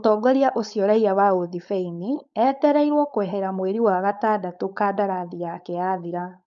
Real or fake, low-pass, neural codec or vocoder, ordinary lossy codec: fake; 7.2 kHz; codec, 16 kHz, 4.8 kbps, FACodec; none